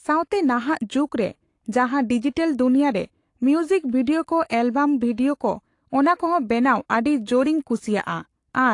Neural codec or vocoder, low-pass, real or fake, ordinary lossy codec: codec, 44.1 kHz, 7.8 kbps, Pupu-Codec; 10.8 kHz; fake; AAC, 48 kbps